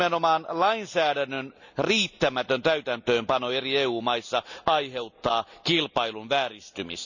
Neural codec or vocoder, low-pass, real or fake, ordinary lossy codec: none; 7.2 kHz; real; none